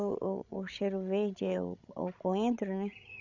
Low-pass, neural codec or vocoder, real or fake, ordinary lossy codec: 7.2 kHz; codec, 16 kHz, 16 kbps, FreqCodec, larger model; fake; none